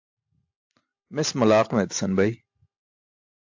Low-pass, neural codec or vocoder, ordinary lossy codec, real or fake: 7.2 kHz; none; AAC, 48 kbps; real